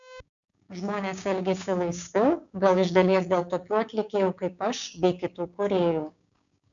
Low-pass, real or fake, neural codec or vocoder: 7.2 kHz; fake; codec, 16 kHz, 6 kbps, DAC